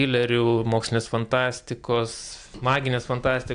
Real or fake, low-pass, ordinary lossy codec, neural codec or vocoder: real; 9.9 kHz; AAC, 64 kbps; none